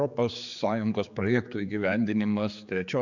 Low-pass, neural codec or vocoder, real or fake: 7.2 kHz; codec, 16 kHz, 4 kbps, X-Codec, HuBERT features, trained on general audio; fake